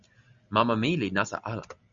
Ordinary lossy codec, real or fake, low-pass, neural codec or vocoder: MP3, 64 kbps; real; 7.2 kHz; none